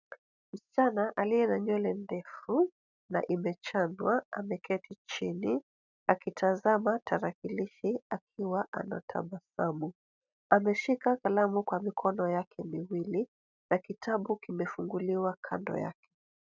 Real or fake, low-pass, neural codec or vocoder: real; 7.2 kHz; none